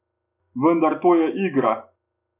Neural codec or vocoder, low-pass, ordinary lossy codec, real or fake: none; 3.6 kHz; none; real